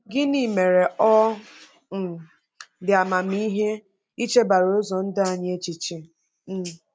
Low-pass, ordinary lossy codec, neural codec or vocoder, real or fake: none; none; none; real